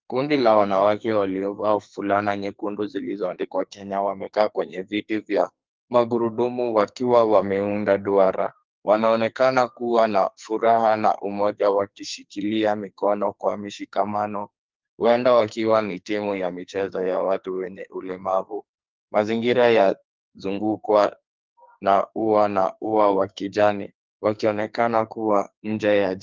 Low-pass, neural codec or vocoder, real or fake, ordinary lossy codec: 7.2 kHz; codec, 44.1 kHz, 2.6 kbps, SNAC; fake; Opus, 32 kbps